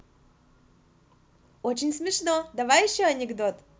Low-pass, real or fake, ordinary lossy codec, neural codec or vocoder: none; real; none; none